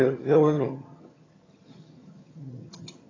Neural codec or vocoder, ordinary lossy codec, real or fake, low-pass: vocoder, 22.05 kHz, 80 mel bands, HiFi-GAN; AAC, 32 kbps; fake; 7.2 kHz